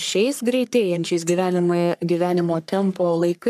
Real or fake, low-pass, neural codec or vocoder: fake; 14.4 kHz; codec, 44.1 kHz, 3.4 kbps, Pupu-Codec